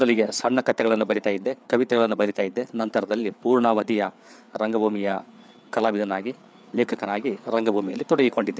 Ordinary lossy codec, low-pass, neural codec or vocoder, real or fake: none; none; codec, 16 kHz, 4 kbps, FreqCodec, larger model; fake